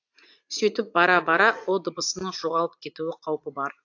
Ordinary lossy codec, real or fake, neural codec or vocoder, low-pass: AAC, 48 kbps; fake; vocoder, 44.1 kHz, 80 mel bands, Vocos; 7.2 kHz